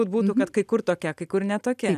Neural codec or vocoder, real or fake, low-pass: none; real; 14.4 kHz